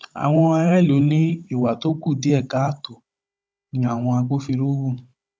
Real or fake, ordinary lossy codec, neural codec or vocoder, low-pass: fake; none; codec, 16 kHz, 16 kbps, FunCodec, trained on Chinese and English, 50 frames a second; none